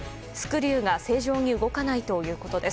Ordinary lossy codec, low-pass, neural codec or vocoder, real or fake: none; none; none; real